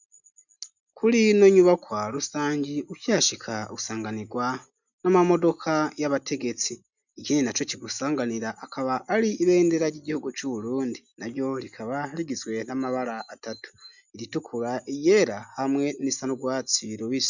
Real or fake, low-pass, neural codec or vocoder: real; 7.2 kHz; none